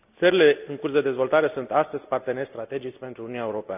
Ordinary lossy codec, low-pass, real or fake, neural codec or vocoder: none; 3.6 kHz; real; none